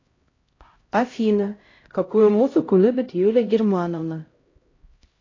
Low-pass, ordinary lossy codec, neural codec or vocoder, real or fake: 7.2 kHz; AAC, 32 kbps; codec, 16 kHz, 0.5 kbps, X-Codec, HuBERT features, trained on LibriSpeech; fake